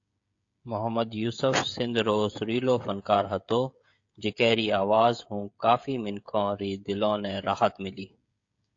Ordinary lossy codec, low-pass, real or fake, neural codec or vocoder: AAC, 48 kbps; 7.2 kHz; fake; codec, 16 kHz, 16 kbps, FreqCodec, smaller model